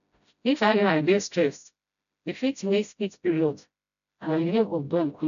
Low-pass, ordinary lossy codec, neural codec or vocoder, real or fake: 7.2 kHz; none; codec, 16 kHz, 0.5 kbps, FreqCodec, smaller model; fake